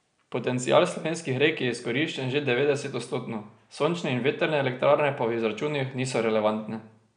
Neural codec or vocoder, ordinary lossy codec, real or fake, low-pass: none; none; real; 9.9 kHz